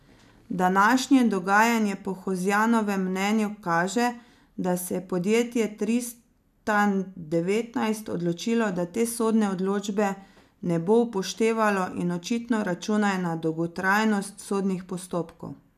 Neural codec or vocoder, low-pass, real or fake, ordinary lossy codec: none; 14.4 kHz; real; none